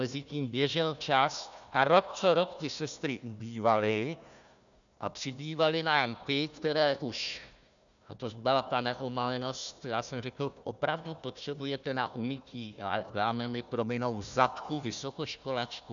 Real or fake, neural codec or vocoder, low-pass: fake; codec, 16 kHz, 1 kbps, FunCodec, trained on Chinese and English, 50 frames a second; 7.2 kHz